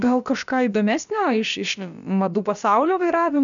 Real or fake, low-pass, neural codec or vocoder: fake; 7.2 kHz; codec, 16 kHz, about 1 kbps, DyCAST, with the encoder's durations